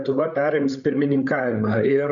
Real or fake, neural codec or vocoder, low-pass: fake; codec, 16 kHz, 8 kbps, FreqCodec, larger model; 7.2 kHz